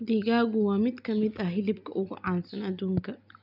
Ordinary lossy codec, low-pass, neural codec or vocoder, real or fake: none; 5.4 kHz; none; real